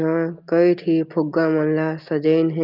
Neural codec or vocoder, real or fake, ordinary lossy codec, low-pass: none; real; Opus, 32 kbps; 5.4 kHz